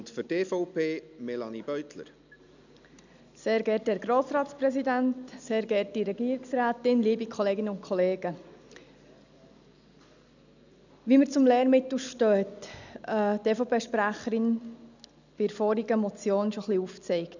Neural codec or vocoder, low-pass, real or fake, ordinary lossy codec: none; 7.2 kHz; real; none